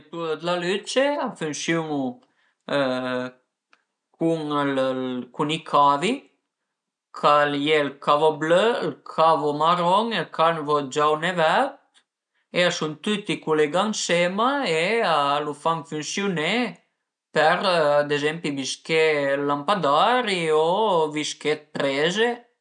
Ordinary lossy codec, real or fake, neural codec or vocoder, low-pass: none; real; none; 9.9 kHz